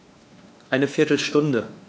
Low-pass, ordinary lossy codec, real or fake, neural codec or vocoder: none; none; fake; codec, 16 kHz, 2 kbps, X-Codec, WavLM features, trained on Multilingual LibriSpeech